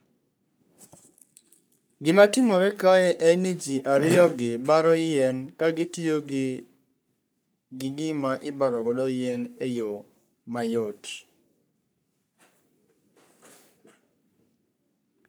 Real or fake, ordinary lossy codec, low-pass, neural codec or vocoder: fake; none; none; codec, 44.1 kHz, 3.4 kbps, Pupu-Codec